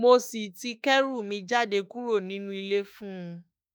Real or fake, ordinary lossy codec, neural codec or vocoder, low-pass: fake; none; autoencoder, 48 kHz, 32 numbers a frame, DAC-VAE, trained on Japanese speech; none